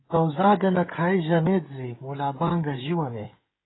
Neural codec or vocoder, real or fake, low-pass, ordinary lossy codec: codec, 16 kHz, 16 kbps, FreqCodec, smaller model; fake; 7.2 kHz; AAC, 16 kbps